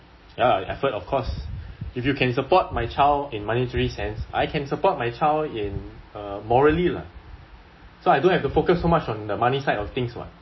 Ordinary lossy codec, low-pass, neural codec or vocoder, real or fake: MP3, 24 kbps; 7.2 kHz; none; real